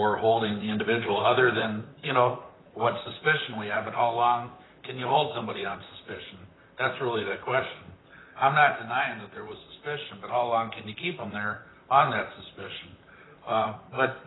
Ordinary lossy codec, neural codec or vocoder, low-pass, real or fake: AAC, 16 kbps; vocoder, 44.1 kHz, 128 mel bands, Pupu-Vocoder; 7.2 kHz; fake